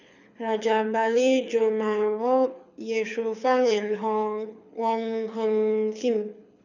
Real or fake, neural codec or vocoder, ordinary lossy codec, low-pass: fake; codec, 24 kHz, 6 kbps, HILCodec; none; 7.2 kHz